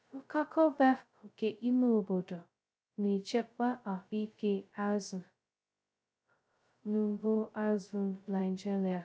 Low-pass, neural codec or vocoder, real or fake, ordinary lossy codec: none; codec, 16 kHz, 0.2 kbps, FocalCodec; fake; none